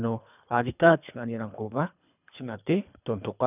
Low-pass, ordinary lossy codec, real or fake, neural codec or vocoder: 3.6 kHz; none; fake; codec, 24 kHz, 3 kbps, HILCodec